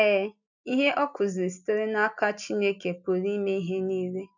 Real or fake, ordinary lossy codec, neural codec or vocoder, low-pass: real; none; none; 7.2 kHz